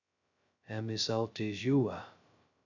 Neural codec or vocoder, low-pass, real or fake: codec, 16 kHz, 0.2 kbps, FocalCodec; 7.2 kHz; fake